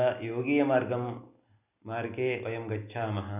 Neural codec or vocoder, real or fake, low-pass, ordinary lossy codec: none; real; 3.6 kHz; none